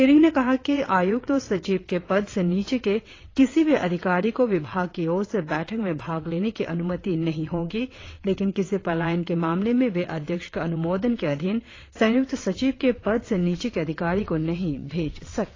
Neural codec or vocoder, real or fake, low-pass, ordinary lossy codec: vocoder, 22.05 kHz, 80 mel bands, WaveNeXt; fake; 7.2 kHz; AAC, 32 kbps